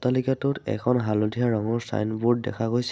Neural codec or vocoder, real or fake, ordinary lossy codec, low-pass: none; real; none; none